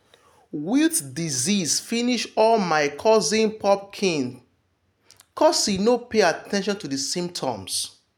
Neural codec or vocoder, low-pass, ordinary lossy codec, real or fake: none; none; none; real